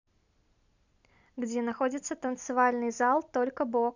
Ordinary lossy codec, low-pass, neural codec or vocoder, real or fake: none; 7.2 kHz; none; real